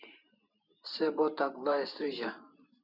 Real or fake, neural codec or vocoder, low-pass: fake; vocoder, 44.1 kHz, 128 mel bands every 512 samples, BigVGAN v2; 5.4 kHz